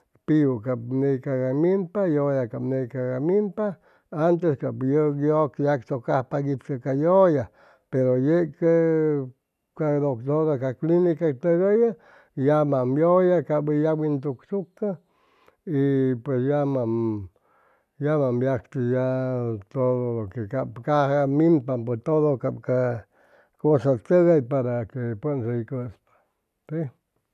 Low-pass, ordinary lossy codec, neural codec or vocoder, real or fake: 14.4 kHz; none; none; real